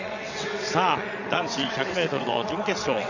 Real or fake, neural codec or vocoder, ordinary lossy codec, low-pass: fake; vocoder, 22.05 kHz, 80 mel bands, WaveNeXt; none; 7.2 kHz